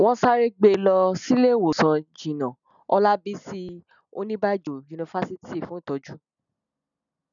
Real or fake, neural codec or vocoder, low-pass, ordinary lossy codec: real; none; 7.2 kHz; none